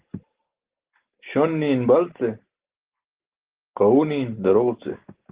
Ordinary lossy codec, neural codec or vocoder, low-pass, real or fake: Opus, 16 kbps; none; 3.6 kHz; real